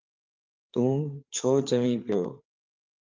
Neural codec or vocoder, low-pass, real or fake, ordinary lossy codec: codec, 16 kHz, 4 kbps, FreqCodec, larger model; 7.2 kHz; fake; Opus, 24 kbps